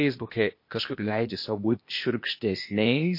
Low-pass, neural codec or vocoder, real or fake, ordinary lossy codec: 5.4 kHz; codec, 16 kHz, 0.8 kbps, ZipCodec; fake; MP3, 32 kbps